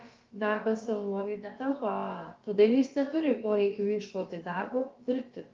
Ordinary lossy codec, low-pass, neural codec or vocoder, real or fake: Opus, 32 kbps; 7.2 kHz; codec, 16 kHz, about 1 kbps, DyCAST, with the encoder's durations; fake